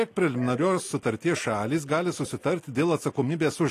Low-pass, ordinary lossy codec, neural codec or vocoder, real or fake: 14.4 kHz; AAC, 48 kbps; none; real